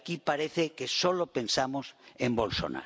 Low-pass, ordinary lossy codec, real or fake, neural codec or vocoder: none; none; real; none